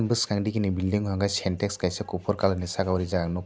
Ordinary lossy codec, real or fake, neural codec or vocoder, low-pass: none; real; none; none